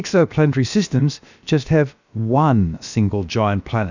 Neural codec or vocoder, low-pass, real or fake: codec, 16 kHz, about 1 kbps, DyCAST, with the encoder's durations; 7.2 kHz; fake